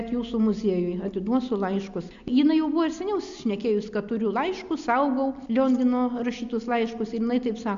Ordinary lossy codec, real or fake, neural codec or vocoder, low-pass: AAC, 64 kbps; real; none; 7.2 kHz